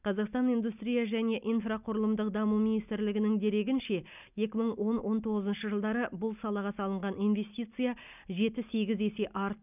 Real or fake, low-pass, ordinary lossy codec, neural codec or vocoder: real; 3.6 kHz; none; none